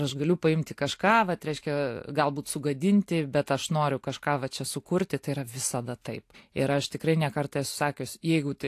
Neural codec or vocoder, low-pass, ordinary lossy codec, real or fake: none; 14.4 kHz; AAC, 64 kbps; real